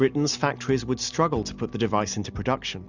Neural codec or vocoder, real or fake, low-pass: none; real; 7.2 kHz